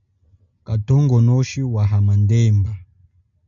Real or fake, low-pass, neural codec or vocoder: real; 7.2 kHz; none